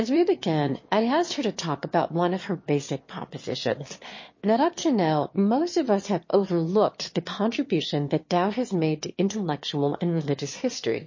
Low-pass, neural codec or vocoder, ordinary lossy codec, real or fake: 7.2 kHz; autoencoder, 22.05 kHz, a latent of 192 numbers a frame, VITS, trained on one speaker; MP3, 32 kbps; fake